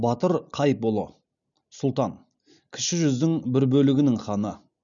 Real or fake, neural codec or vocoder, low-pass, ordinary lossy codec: real; none; 7.2 kHz; none